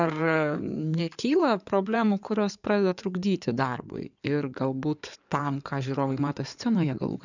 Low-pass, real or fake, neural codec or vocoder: 7.2 kHz; fake; codec, 16 kHz in and 24 kHz out, 2.2 kbps, FireRedTTS-2 codec